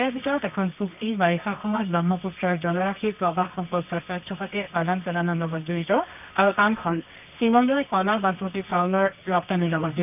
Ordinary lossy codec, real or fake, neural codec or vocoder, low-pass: none; fake; codec, 24 kHz, 0.9 kbps, WavTokenizer, medium music audio release; 3.6 kHz